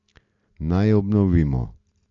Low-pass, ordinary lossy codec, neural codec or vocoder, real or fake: 7.2 kHz; AAC, 48 kbps; none; real